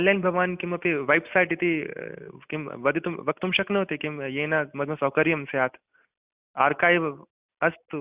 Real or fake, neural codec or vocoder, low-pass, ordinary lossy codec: real; none; 3.6 kHz; Opus, 64 kbps